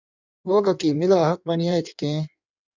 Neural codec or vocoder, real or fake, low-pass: codec, 16 kHz in and 24 kHz out, 1.1 kbps, FireRedTTS-2 codec; fake; 7.2 kHz